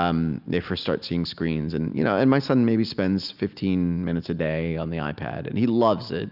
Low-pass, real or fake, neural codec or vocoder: 5.4 kHz; real; none